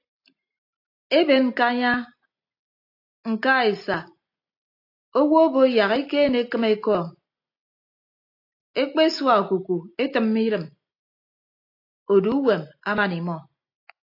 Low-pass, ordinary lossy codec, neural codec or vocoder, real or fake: 5.4 kHz; AAC, 32 kbps; none; real